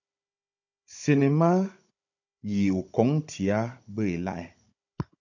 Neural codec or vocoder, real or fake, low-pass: codec, 16 kHz, 16 kbps, FunCodec, trained on Chinese and English, 50 frames a second; fake; 7.2 kHz